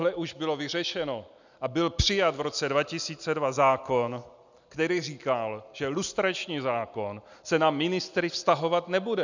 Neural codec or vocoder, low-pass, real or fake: none; 7.2 kHz; real